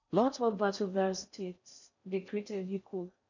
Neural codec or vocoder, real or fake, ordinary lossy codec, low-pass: codec, 16 kHz in and 24 kHz out, 0.6 kbps, FocalCodec, streaming, 4096 codes; fake; none; 7.2 kHz